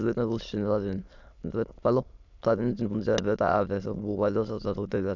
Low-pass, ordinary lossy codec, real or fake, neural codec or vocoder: 7.2 kHz; none; fake; autoencoder, 22.05 kHz, a latent of 192 numbers a frame, VITS, trained on many speakers